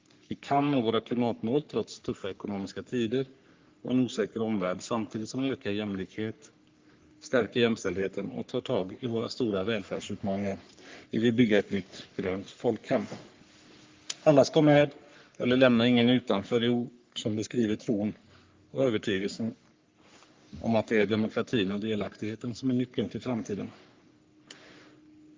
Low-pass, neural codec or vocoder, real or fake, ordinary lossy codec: 7.2 kHz; codec, 44.1 kHz, 3.4 kbps, Pupu-Codec; fake; Opus, 24 kbps